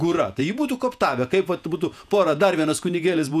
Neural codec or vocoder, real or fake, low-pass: vocoder, 48 kHz, 128 mel bands, Vocos; fake; 14.4 kHz